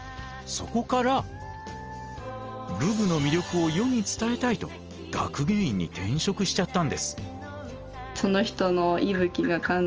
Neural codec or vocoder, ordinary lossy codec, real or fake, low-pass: none; Opus, 24 kbps; real; 7.2 kHz